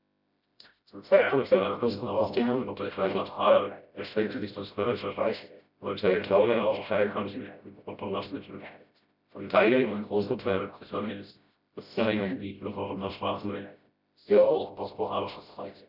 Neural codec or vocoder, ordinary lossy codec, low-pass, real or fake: codec, 16 kHz, 0.5 kbps, FreqCodec, smaller model; none; 5.4 kHz; fake